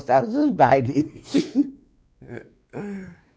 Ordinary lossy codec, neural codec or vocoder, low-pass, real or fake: none; codec, 16 kHz, 2 kbps, X-Codec, WavLM features, trained on Multilingual LibriSpeech; none; fake